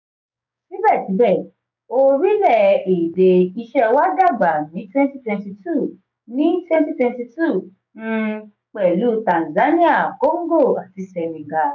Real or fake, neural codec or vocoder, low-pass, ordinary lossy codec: fake; codec, 16 kHz, 6 kbps, DAC; 7.2 kHz; none